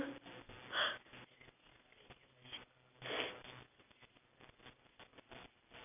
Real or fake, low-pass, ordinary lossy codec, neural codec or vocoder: real; 3.6 kHz; none; none